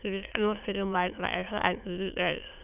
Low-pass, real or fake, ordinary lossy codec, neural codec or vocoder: 3.6 kHz; fake; none; autoencoder, 22.05 kHz, a latent of 192 numbers a frame, VITS, trained on many speakers